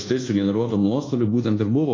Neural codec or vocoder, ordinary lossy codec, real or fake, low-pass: codec, 24 kHz, 1.2 kbps, DualCodec; AAC, 32 kbps; fake; 7.2 kHz